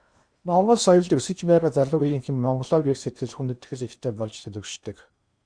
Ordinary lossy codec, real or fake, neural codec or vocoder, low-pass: Opus, 64 kbps; fake; codec, 16 kHz in and 24 kHz out, 0.8 kbps, FocalCodec, streaming, 65536 codes; 9.9 kHz